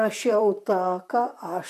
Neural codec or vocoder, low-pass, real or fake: vocoder, 44.1 kHz, 128 mel bands, Pupu-Vocoder; 14.4 kHz; fake